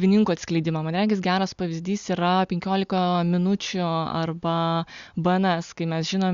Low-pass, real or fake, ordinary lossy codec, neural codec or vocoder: 7.2 kHz; real; Opus, 64 kbps; none